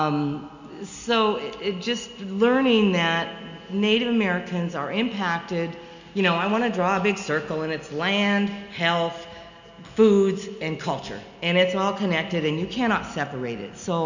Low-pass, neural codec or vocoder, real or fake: 7.2 kHz; none; real